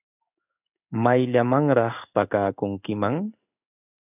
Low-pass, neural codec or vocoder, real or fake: 3.6 kHz; codec, 16 kHz in and 24 kHz out, 1 kbps, XY-Tokenizer; fake